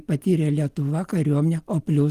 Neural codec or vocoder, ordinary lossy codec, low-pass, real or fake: none; Opus, 24 kbps; 14.4 kHz; real